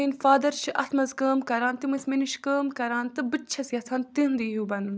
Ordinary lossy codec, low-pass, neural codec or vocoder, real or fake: none; none; none; real